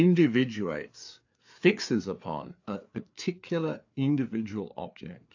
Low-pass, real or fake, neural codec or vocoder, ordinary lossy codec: 7.2 kHz; fake; codec, 16 kHz, 4 kbps, FreqCodec, larger model; AAC, 48 kbps